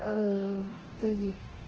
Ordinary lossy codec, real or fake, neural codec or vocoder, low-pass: Opus, 16 kbps; fake; codec, 24 kHz, 0.9 kbps, DualCodec; 7.2 kHz